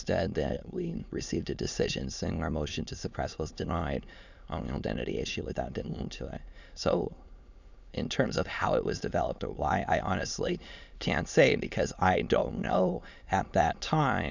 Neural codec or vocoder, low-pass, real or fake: autoencoder, 22.05 kHz, a latent of 192 numbers a frame, VITS, trained on many speakers; 7.2 kHz; fake